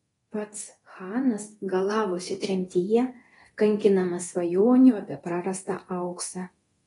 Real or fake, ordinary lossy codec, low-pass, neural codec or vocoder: fake; AAC, 32 kbps; 10.8 kHz; codec, 24 kHz, 0.9 kbps, DualCodec